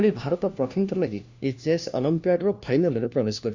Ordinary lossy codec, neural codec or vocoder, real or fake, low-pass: Opus, 64 kbps; codec, 16 kHz, 1 kbps, FunCodec, trained on LibriTTS, 50 frames a second; fake; 7.2 kHz